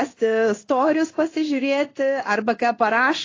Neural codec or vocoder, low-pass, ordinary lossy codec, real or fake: codec, 16 kHz in and 24 kHz out, 1 kbps, XY-Tokenizer; 7.2 kHz; AAC, 32 kbps; fake